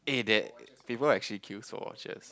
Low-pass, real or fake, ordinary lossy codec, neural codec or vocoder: none; real; none; none